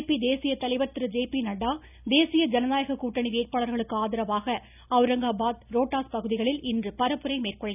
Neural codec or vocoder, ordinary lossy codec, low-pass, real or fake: none; none; 3.6 kHz; real